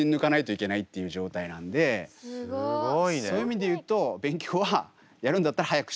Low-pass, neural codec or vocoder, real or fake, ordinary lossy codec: none; none; real; none